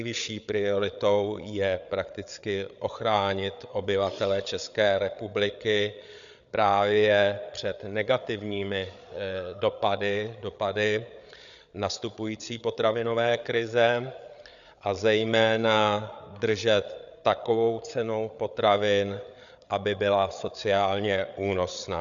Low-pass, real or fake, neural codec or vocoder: 7.2 kHz; fake; codec, 16 kHz, 8 kbps, FreqCodec, larger model